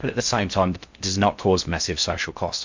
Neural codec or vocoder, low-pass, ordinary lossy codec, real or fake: codec, 16 kHz in and 24 kHz out, 0.6 kbps, FocalCodec, streaming, 4096 codes; 7.2 kHz; MP3, 64 kbps; fake